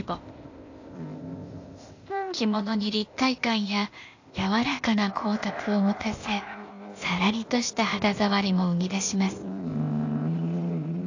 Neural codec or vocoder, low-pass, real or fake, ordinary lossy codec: codec, 16 kHz, 0.8 kbps, ZipCodec; 7.2 kHz; fake; AAC, 48 kbps